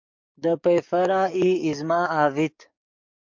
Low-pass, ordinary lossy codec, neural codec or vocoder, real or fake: 7.2 kHz; MP3, 64 kbps; codec, 44.1 kHz, 7.8 kbps, DAC; fake